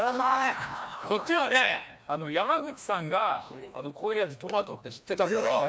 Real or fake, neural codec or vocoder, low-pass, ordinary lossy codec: fake; codec, 16 kHz, 1 kbps, FreqCodec, larger model; none; none